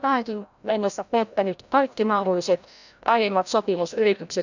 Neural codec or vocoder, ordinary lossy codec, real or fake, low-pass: codec, 16 kHz, 0.5 kbps, FreqCodec, larger model; none; fake; 7.2 kHz